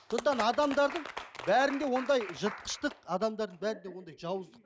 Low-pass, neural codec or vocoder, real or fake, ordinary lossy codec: none; none; real; none